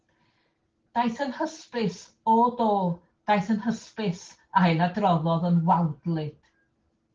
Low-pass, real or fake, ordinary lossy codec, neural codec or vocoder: 7.2 kHz; real; Opus, 16 kbps; none